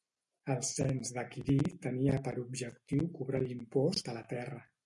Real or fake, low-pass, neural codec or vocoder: real; 10.8 kHz; none